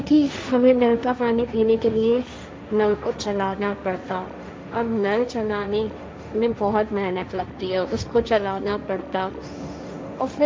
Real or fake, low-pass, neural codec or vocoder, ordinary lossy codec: fake; none; codec, 16 kHz, 1.1 kbps, Voila-Tokenizer; none